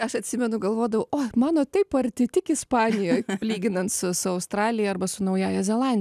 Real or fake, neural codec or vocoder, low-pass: real; none; 14.4 kHz